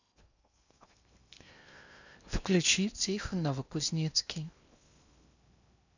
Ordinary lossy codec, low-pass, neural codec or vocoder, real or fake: none; 7.2 kHz; codec, 16 kHz in and 24 kHz out, 0.8 kbps, FocalCodec, streaming, 65536 codes; fake